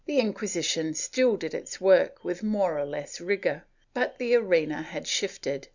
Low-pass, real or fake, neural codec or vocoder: 7.2 kHz; real; none